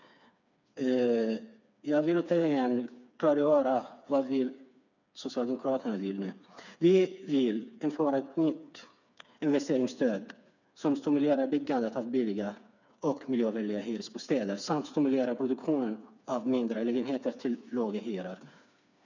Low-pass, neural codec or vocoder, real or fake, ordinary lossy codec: 7.2 kHz; codec, 16 kHz, 4 kbps, FreqCodec, smaller model; fake; none